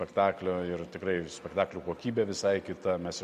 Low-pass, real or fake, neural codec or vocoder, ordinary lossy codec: 14.4 kHz; real; none; Opus, 64 kbps